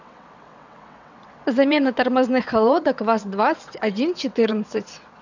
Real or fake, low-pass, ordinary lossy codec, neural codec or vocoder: fake; 7.2 kHz; none; vocoder, 22.05 kHz, 80 mel bands, WaveNeXt